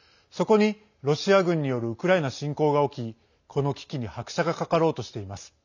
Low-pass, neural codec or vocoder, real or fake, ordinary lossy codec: 7.2 kHz; none; real; none